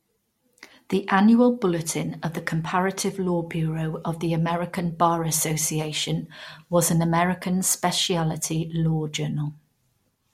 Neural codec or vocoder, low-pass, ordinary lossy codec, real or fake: none; 19.8 kHz; MP3, 64 kbps; real